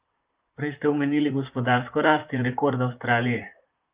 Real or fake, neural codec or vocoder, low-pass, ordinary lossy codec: fake; vocoder, 44.1 kHz, 128 mel bands, Pupu-Vocoder; 3.6 kHz; Opus, 24 kbps